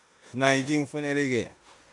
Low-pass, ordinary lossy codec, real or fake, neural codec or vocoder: 10.8 kHz; AAC, 64 kbps; fake; codec, 16 kHz in and 24 kHz out, 0.9 kbps, LongCat-Audio-Codec, four codebook decoder